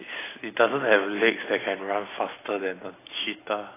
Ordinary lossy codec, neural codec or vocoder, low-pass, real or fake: AAC, 16 kbps; none; 3.6 kHz; real